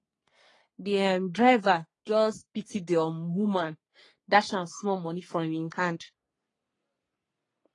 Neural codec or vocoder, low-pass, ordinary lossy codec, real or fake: codec, 44.1 kHz, 3.4 kbps, Pupu-Codec; 10.8 kHz; AAC, 32 kbps; fake